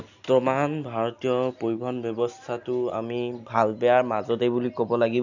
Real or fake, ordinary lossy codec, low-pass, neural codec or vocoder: real; none; 7.2 kHz; none